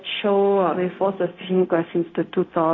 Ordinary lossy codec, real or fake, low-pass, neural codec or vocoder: AAC, 32 kbps; fake; 7.2 kHz; codec, 16 kHz, 0.4 kbps, LongCat-Audio-Codec